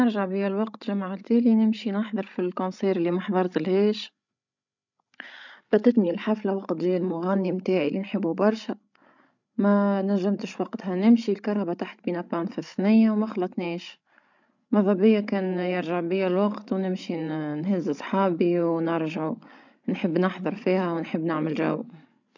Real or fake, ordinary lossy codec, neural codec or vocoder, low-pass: fake; none; codec, 16 kHz, 16 kbps, FreqCodec, larger model; 7.2 kHz